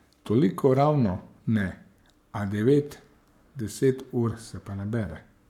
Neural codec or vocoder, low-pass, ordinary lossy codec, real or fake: codec, 44.1 kHz, 7.8 kbps, Pupu-Codec; 19.8 kHz; none; fake